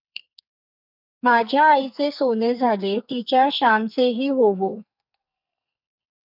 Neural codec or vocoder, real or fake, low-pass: codec, 44.1 kHz, 2.6 kbps, SNAC; fake; 5.4 kHz